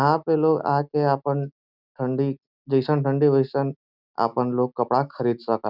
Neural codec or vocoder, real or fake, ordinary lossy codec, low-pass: none; real; none; 5.4 kHz